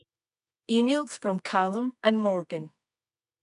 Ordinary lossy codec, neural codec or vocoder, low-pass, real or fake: none; codec, 24 kHz, 0.9 kbps, WavTokenizer, medium music audio release; 10.8 kHz; fake